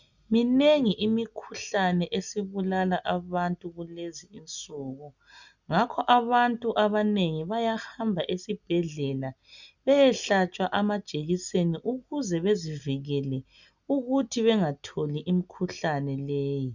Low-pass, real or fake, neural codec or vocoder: 7.2 kHz; real; none